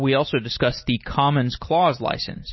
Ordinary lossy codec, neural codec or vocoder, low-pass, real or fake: MP3, 24 kbps; none; 7.2 kHz; real